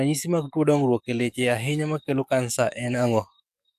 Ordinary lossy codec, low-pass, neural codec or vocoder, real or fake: AAC, 96 kbps; 14.4 kHz; codec, 44.1 kHz, 7.8 kbps, DAC; fake